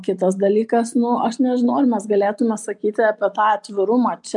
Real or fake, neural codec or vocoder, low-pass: real; none; 10.8 kHz